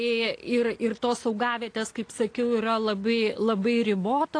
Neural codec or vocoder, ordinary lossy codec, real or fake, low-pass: none; AAC, 48 kbps; real; 9.9 kHz